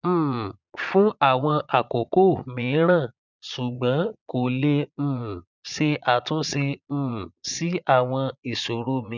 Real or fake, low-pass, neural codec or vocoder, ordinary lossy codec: fake; 7.2 kHz; vocoder, 22.05 kHz, 80 mel bands, Vocos; none